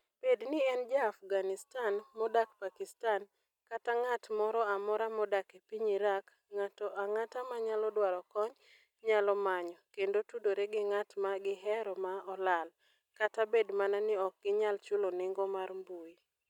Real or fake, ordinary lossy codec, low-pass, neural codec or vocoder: real; none; 19.8 kHz; none